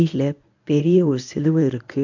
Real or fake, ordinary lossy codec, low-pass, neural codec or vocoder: fake; none; 7.2 kHz; codec, 24 kHz, 0.9 kbps, WavTokenizer, medium speech release version 1